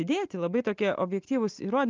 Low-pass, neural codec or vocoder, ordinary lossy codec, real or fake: 7.2 kHz; none; Opus, 24 kbps; real